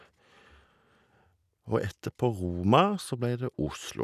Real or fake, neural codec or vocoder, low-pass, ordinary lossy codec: real; none; 14.4 kHz; none